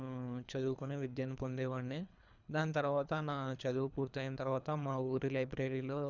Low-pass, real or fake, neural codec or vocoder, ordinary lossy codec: 7.2 kHz; fake; codec, 24 kHz, 3 kbps, HILCodec; none